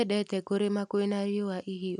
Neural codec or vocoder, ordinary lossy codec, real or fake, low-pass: none; none; real; 10.8 kHz